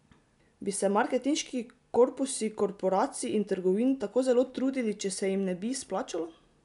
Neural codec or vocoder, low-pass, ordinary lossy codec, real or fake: none; 10.8 kHz; none; real